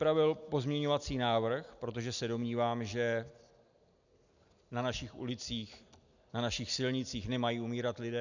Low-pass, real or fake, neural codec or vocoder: 7.2 kHz; real; none